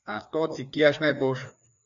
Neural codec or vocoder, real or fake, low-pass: codec, 16 kHz, 2 kbps, FreqCodec, larger model; fake; 7.2 kHz